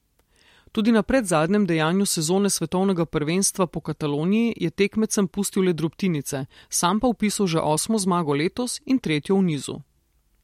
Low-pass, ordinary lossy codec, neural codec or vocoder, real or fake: 19.8 kHz; MP3, 64 kbps; none; real